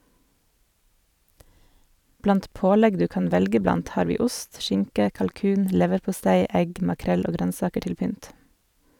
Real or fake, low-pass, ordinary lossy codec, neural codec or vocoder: fake; 19.8 kHz; none; vocoder, 44.1 kHz, 128 mel bands every 512 samples, BigVGAN v2